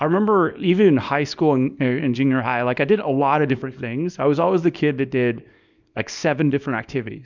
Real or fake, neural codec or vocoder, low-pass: fake; codec, 24 kHz, 0.9 kbps, WavTokenizer, small release; 7.2 kHz